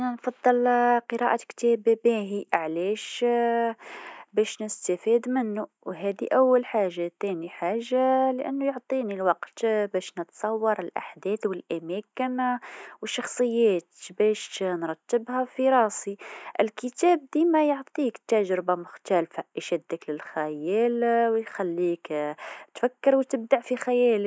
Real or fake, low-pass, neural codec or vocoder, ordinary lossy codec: real; none; none; none